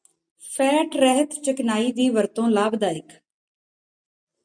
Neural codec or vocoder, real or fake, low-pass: none; real; 9.9 kHz